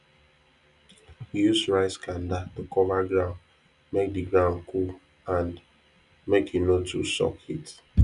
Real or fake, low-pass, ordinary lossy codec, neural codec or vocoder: real; 10.8 kHz; none; none